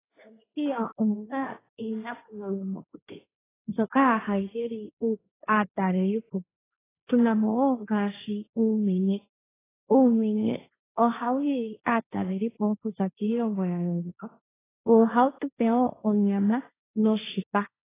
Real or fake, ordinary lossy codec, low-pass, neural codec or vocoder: fake; AAC, 16 kbps; 3.6 kHz; codec, 16 kHz, 1.1 kbps, Voila-Tokenizer